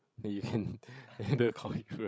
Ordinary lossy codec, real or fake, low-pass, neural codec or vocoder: none; fake; none; codec, 16 kHz, 16 kbps, FreqCodec, larger model